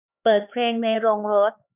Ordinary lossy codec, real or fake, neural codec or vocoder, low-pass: none; fake; codec, 16 kHz, 4 kbps, X-Codec, HuBERT features, trained on LibriSpeech; 3.6 kHz